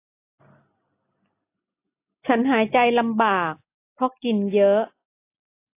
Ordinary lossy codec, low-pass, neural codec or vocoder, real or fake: AAC, 24 kbps; 3.6 kHz; none; real